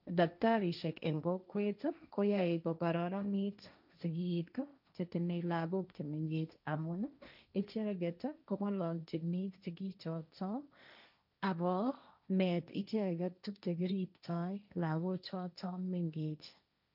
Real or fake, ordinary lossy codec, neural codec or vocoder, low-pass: fake; none; codec, 16 kHz, 1.1 kbps, Voila-Tokenizer; 5.4 kHz